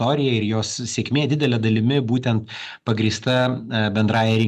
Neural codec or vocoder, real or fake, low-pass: none; real; 14.4 kHz